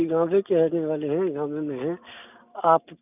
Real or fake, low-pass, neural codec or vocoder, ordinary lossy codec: real; 3.6 kHz; none; none